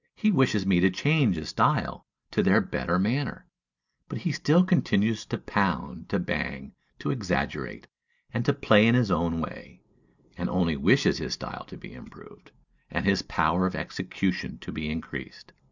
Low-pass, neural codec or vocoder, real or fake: 7.2 kHz; none; real